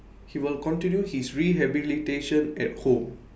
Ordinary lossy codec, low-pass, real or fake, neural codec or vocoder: none; none; real; none